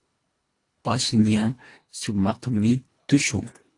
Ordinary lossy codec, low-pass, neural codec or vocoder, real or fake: AAC, 48 kbps; 10.8 kHz; codec, 24 kHz, 1.5 kbps, HILCodec; fake